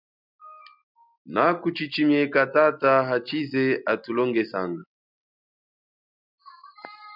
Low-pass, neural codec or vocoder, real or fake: 5.4 kHz; none; real